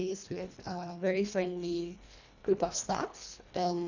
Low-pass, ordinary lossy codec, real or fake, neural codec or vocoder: 7.2 kHz; none; fake; codec, 24 kHz, 1.5 kbps, HILCodec